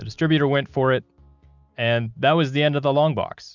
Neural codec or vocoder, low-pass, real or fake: none; 7.2 kHz; real